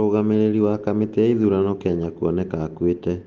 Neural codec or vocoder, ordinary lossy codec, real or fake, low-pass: none; Opus, 16 kbps; real; 7.2 kHz